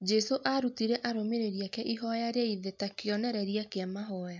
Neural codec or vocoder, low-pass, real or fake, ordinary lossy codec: none; 7.2 kHz; real; MP3, 64 kbps